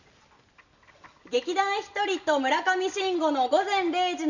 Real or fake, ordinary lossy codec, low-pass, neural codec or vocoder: real; none; 7.2 kHz; none